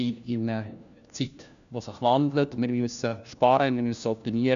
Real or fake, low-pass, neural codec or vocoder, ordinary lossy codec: fake; 7.2 kHz; codec, 16 kHz, 1 kbps, FunCodec, trained on LibriTTS, 50 frames a second; MP3, 96 kbps